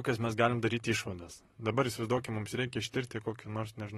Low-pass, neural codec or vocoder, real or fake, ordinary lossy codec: 19.8 kHz; vocoder, 44.1 kHz, 128 mel bands, Pupu-Vocoder; fake; AAC, 32 kbps